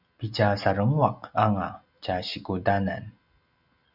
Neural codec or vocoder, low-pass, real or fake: none; 5.4 kHz; real